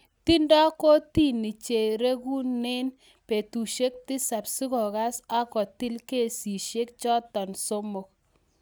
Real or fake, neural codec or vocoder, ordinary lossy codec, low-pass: real; none; none; none